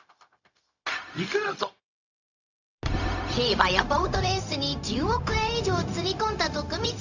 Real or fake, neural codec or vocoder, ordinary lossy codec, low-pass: fake; codec, 16 kHz, 0.4 kbps, LongCat-Audio-Codec; none; 7.2 kHz